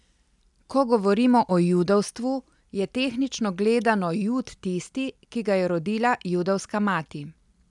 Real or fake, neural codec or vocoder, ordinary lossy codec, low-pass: real; none; none; 10.8 kHz